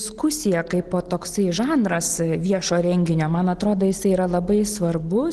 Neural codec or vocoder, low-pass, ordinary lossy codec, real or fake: none; 10.8 kHz; Opus, 16 kbps; real